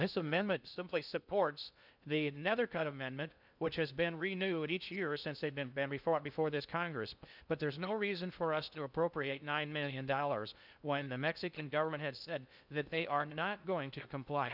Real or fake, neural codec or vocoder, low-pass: fake; codec, 16 kHz in and 24 kHz out, 0.6 kbps, FocalCodec, streaming, 2048 codes; 5.4 kHz